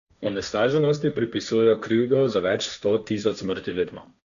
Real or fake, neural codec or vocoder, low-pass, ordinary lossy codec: fake; codec, 16 kHz, 1.1 kbps, Voila-Tokenizer; 7.2 kHz; none